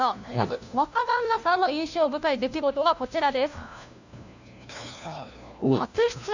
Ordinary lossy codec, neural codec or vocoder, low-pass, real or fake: none; codec, 16 kHz, 1 kbps, FunCodec, trained on LibriTTS, 50 frames a second; 7.2 kHz; fake